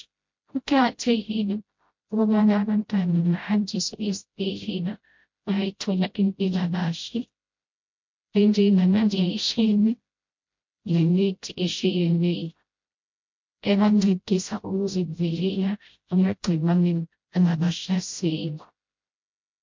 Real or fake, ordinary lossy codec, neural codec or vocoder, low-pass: fake; MP3, 48 kbps; codec, 16 kHz, 0.5 kbps, FreqCodec, smaller model; 7.2 kHz